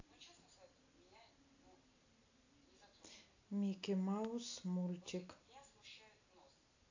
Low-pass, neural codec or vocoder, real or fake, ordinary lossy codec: 7.2 kHz; none; real; AAC, 48 kbps